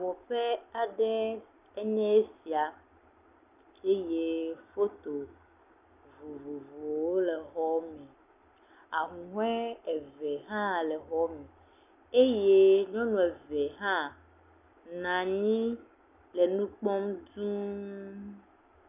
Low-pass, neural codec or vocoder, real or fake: 3.6 kHz; none; real